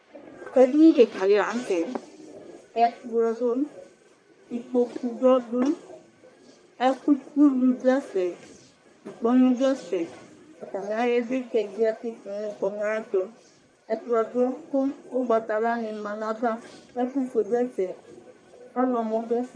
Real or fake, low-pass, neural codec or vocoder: fake; 9.9 kHz; codec, 44.1 kHz, 1.7 kbps, Pupu-Codec